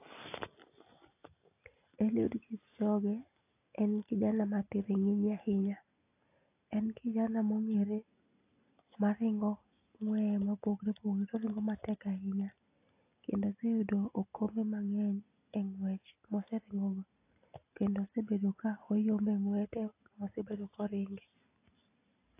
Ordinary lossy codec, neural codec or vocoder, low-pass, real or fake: AAC, 24 kbps; none; 3.6 kHz; real